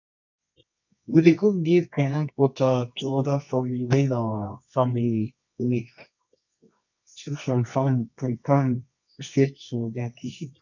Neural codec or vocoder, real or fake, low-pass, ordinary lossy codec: codec, 24 kHz, 0.9 kbps, WavTokenizer, medium music audio release; fake; 7.2 kHz; none